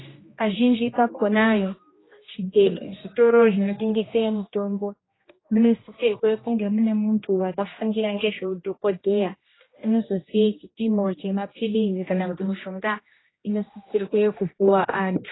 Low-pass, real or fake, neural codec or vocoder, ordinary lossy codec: 7.2 kHz; fake; codec, 16 kHz, 1 kbps, X-Codec, HuBERT features, trained on general audio; AAC, 16 kbps